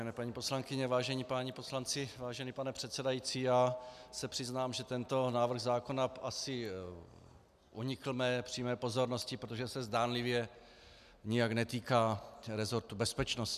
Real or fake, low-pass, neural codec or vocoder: real; 14.4 kHz; none